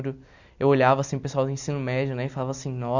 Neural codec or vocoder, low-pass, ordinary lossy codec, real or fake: none; 7.2 kHz; none; real